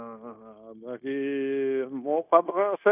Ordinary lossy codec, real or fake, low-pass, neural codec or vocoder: none; fake; 3.6 kHz; codec, 16 kHz, 0.9 kbps, LongCat-Audio-Codec